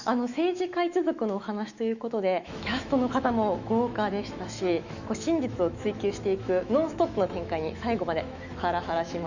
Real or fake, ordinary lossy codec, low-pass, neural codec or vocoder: fake; none; 7.2 kHz; autoencoder, 48 kHz, 128 numbers a frame, DAC-VAE, trained on Japanese speech